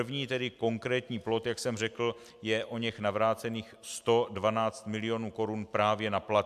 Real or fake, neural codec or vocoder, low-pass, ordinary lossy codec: real; none; 14.4 kHz; MP3, 96 kbps